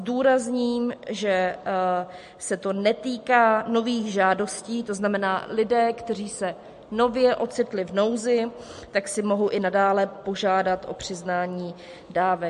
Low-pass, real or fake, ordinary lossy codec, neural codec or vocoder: 14.4 kHz; real; MP3, 48 kbps; none